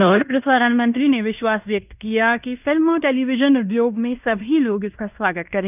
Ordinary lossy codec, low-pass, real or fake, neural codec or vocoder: none; 3.6 kHz; fake; codec, 16 kHz in and 24 kHz out, 0.9 kbps, LongCat-Audio-Codec, fine tuned four codebook decoder